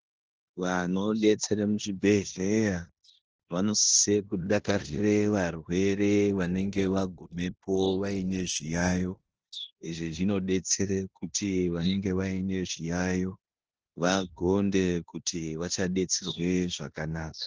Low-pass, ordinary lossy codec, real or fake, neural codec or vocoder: 7.2 kHz; Opus, 16 kbps; fake; codec, 16 kHz in and 24 kHz out, 0.9 kbps, LongCat-Audio-Codec, four codebook decoder